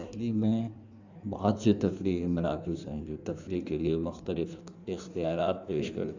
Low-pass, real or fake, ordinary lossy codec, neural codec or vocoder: 7.2 kHz; fake; none; codec, 16 kHz in and 24 kHz out, 1.1 kbps, FireRedTTS-2 codec